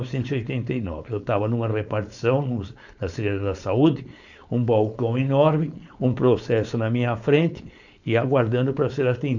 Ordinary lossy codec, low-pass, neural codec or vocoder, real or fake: none; 7.2 kHz; codec, 16 kHz, 4.8 kbps, FACodec; fake